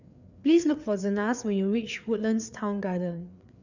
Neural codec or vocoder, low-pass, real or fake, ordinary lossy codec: codec, 16 kHz, 4 kbps, FreqCodec, larger model; 7.2 kHz; fake; none